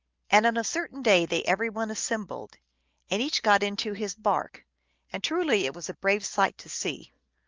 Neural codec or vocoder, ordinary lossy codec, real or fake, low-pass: none; Opus, 24 kbps; real; 7.2 kHz